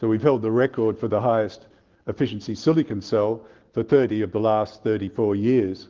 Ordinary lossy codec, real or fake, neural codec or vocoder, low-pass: Opus, 16 kbps; real; none; 7.2 kHz